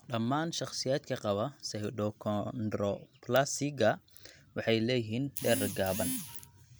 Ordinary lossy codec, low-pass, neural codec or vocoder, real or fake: none; none; none; real